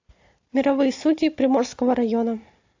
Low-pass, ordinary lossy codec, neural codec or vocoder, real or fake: 7.2 kHz; AAC, 48 kbps; none; real